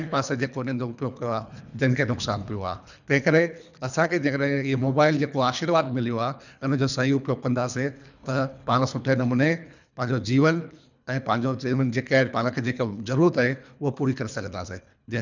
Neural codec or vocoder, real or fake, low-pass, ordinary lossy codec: codec, 24 kHz, 3 kbps, HILCodec; fake; 7.2 kHz; none